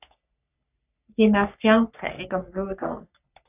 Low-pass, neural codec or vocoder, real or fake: 3.6 kHz; codec, 44.1 kHz, 3.4 kbps, Pupu-Codec; fake